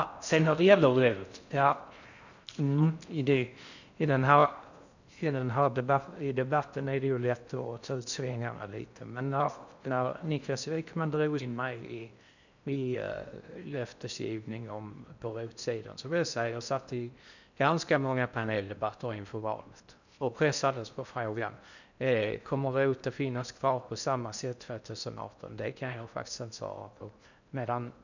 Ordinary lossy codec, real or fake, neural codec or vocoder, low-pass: none; fake; codec, 16 kHz in and 24 kHz out, 0.6 kbps, FocalCodec, streaming, 4096 codes; 7.2 kHz